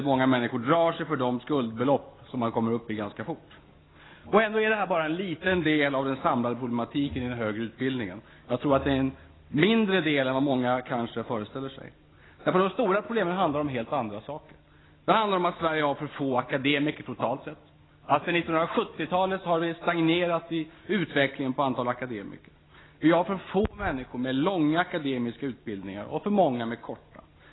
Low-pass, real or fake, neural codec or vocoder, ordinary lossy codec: 7.2 kHz; real; none; AAC, 16 kbps